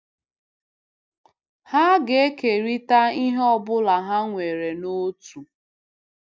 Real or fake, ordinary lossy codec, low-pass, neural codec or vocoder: real; none; none; none